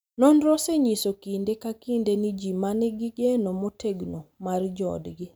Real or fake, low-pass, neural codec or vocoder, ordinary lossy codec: real; none; none; none